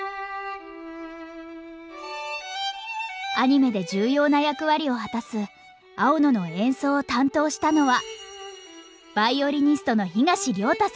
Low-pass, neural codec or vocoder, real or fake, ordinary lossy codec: none; none; real; none